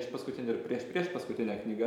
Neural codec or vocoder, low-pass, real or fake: vocoder, 44.1 kHz, 128 mel bands every 512 samples, BigVGAN v2; 19.8 kHz; fake